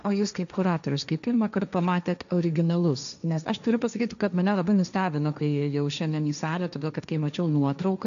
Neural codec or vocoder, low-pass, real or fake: codec, 16 kHz, 1.1 kbps, Voila-Tokenizer; 7.2 kHz; fake